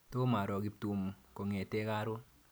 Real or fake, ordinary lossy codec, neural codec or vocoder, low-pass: real; none; none; none